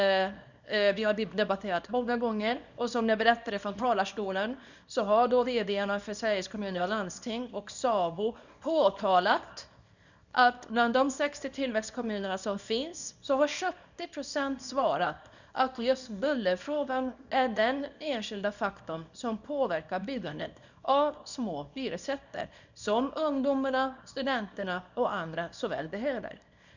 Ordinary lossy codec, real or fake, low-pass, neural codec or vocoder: none; fake; 7.2 kHz; codec, 24 kHz, 0.9 kbps, WavTokenizer, small release